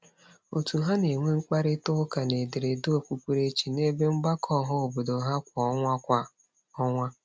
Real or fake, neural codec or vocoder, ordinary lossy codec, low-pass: real; none; none; none